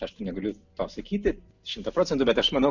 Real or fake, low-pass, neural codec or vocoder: real; 7.2 kHz; none